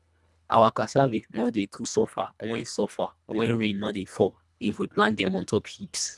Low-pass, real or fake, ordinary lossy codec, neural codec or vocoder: none; fake; none; codec, 24 kHz, 1.5 kbps, HILCodec